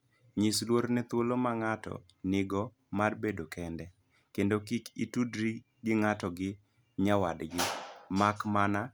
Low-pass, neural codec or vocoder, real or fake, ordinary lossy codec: none; none; real; none